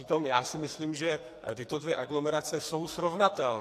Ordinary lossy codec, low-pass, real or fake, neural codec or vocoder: AAC, 64 kbps; 14.4 kHz; fake; codec, 44.1 kHz, 2.6 kbps, SNAC